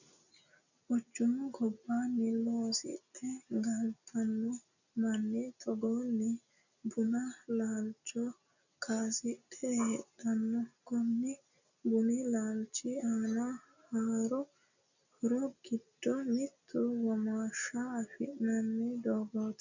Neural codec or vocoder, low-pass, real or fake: none; 7.2 kHz; real